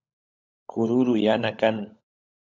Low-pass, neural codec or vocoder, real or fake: 7.2 kHz; codec, 16 kHz, 16 kbps, FunCodec, trained on LibriTTS, 50 frames a second; fake